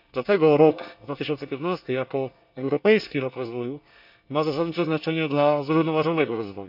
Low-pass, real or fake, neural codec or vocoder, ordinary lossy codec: 5.4 kHz; fake; codec, 24 kHz, 1 kbps, SNAC; none